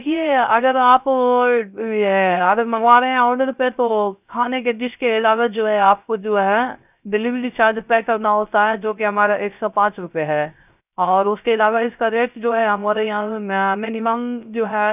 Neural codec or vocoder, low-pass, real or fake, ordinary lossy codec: codec, 16 kHz, 0.3 kbps, FocalCodec; 3.6 kHz; fake; none